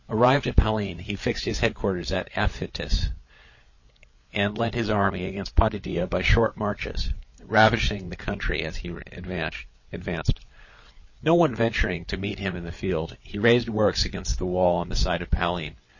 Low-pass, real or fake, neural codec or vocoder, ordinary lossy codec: 7.2 kHz; fake; codec, 16 kHz, 16 kbps, FreqCodec, larger model; MP3, 32 kbps